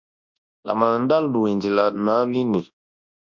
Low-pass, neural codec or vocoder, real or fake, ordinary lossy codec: 7.2 kHz; codec, 24 kHz, 0.9 kbps, WavTokenizer, large speech release; fake; MP3, 48 kbps